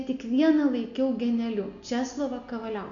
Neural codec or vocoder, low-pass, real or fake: none; 7.2 kHz; real